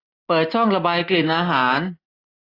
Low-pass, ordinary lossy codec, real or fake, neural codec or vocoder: 5.4 kHz; AAC, 24 kbps; real; none